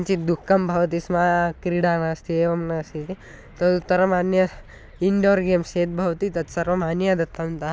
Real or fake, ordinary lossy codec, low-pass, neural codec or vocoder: real; none; none; none